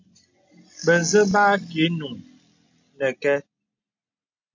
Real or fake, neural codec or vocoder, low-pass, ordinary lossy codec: real; none; 7.2 kHz; MP3, 48 kbps